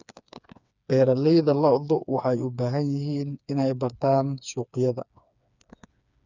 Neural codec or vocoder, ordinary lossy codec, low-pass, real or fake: codec, 16 kHz, 4 kbps, FreqCodec, smaller model; none; 7.2 kHz; fake